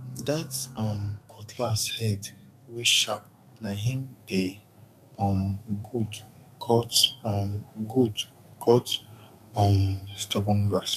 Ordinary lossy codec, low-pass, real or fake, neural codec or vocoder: none; 14.4 kHz; fake; codec, 32 kHz, 1.9 kbps, SNAC